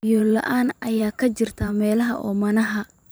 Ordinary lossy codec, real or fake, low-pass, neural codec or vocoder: none; fake; none; vocoder, 44.1 kHz, 128 mel bands every 512 samples, BigVGAN v2